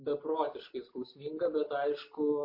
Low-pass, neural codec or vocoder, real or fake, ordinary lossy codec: 5.4 kHz; none; real; MP3, 32 kbps